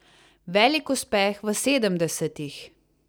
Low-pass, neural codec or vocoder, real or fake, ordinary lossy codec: none; none; real; none